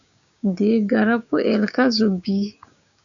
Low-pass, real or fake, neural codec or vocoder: 7.2 kHz; fake; codec, 16 kHz, 6 kbps, DAC